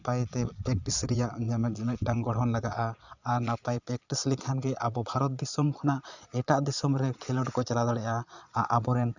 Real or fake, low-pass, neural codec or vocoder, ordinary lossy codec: fake; 7.2 kHz; vocoder, 22.05 kHz, 80 mel bands, Vocos; none